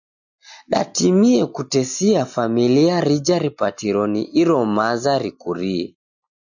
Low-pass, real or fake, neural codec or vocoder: 7.2 kHz; real; none